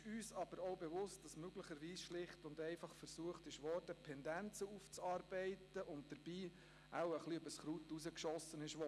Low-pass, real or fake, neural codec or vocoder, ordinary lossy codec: none; real; none; none